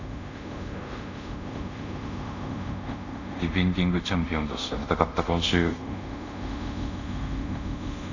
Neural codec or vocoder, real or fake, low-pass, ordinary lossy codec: codec, 24 kHz, 0.5 kbps, DualCodec; fake; 7.2 kHz; none